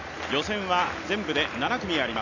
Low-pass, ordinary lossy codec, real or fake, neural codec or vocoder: 7.2 kHz; none; real; none